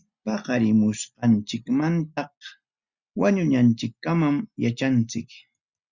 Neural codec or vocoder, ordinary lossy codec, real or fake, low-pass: none; Opus, 64 kbps; real; 7.2 kHz